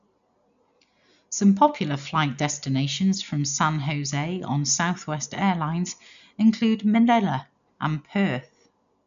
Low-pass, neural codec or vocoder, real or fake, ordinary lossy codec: 7.2 kHz; none; real; none